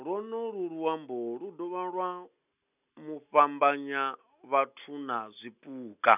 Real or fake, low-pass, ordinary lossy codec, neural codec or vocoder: real; 3.6 kHz; none; none